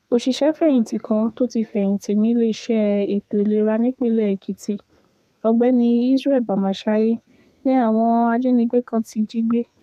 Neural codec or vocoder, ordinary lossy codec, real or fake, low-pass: codec, 32 kHz, 1.9 kbps, SNAC; none; fake; 14.4 kHz